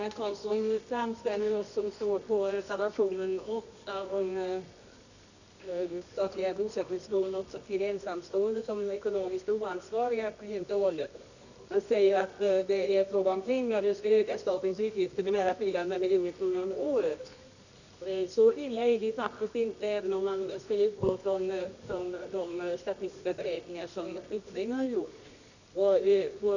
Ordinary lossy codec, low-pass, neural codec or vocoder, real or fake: none; 7.2 kHz; codec, 24 kHz, 0.9 kbps, WavTokenizer, medium music audio release; fake